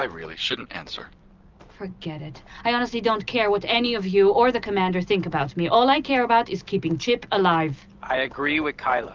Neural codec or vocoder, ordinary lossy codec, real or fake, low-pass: none; Opus, 24 kbps; real; 7.2 kHz